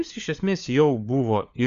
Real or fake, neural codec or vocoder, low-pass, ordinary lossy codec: fake; codec, 16 kHz, 4 kbps, FunCodec, trained on LibriTTS, 50 frames a second; 7.2 kHz; Opus, 64 kbps